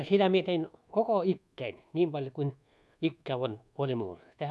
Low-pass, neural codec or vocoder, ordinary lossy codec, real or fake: none; codec, 24 kHz, 1.2 kbps, DualCodec; none; fake